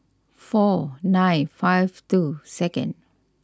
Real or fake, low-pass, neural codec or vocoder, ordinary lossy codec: real; none; none; none